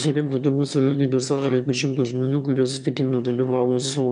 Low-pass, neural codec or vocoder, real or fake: 9.9 kHz; autoencoder, 22.05 kHz, a latent of 192 numbers a frame, VITS, trained on one speaker; fake